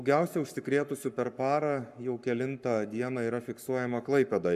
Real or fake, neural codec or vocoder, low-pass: fake; codec, 44.1 kHz, 7.8 kbps, Pupu-Codec; 14.4 kHz